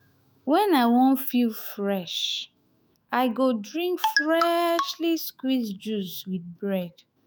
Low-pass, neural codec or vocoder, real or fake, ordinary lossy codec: none; autoencoder, 48 kHz, 128 numbers a frame, DAC-VAE, trained on Japanese speech; fake; none